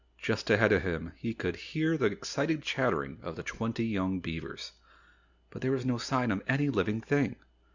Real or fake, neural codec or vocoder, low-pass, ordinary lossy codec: real; none; 7.2 kHz; Opus, 64 kbps